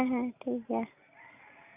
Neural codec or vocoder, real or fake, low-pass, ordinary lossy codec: none; real; 3.6 kHz; none